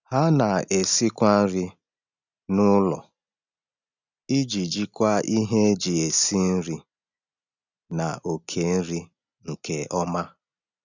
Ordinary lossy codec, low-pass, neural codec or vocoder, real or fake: none; 7.2 kHz; none; real